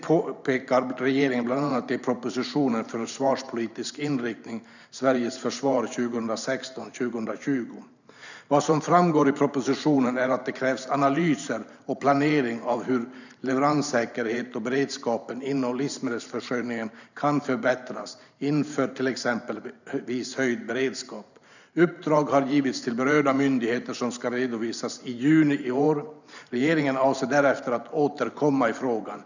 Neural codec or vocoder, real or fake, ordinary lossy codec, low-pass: vocoder, 44.1 kHz, 128 mel bands every 512 samples, BigVGAN v2; fake; none; 7.2 kHz